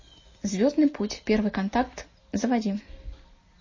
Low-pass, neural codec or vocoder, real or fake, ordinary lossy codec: 7.2 kHz; none; real; MP3, 32 kbps